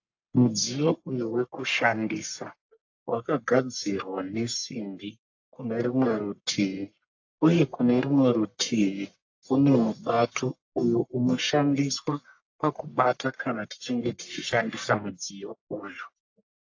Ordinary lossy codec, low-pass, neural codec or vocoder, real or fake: AAC, 48 kbps; 7.2 kHz; codec, 44.1 kHz, 1.7 kbps, Pupu-Codec; fake